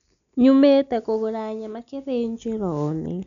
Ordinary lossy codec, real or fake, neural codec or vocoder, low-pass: none; real; none; 7.2 kHz